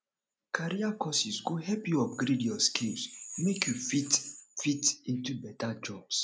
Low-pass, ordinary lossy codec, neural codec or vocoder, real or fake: none; none; none; real